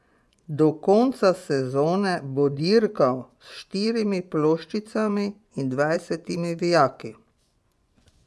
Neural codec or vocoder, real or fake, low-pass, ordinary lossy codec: vocoder, 24 kHz, 100 mel bands, Vocos; fake; none; none